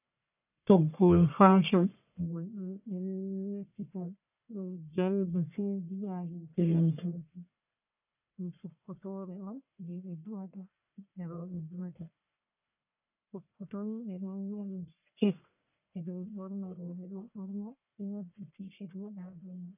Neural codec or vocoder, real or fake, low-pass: codec, 44.1 kHz, 1.7 kbps, Pupu-Codec; fake; 3.6 kHz